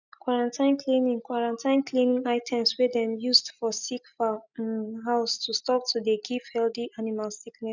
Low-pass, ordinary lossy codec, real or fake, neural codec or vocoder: 7.2 kHz; none; real; none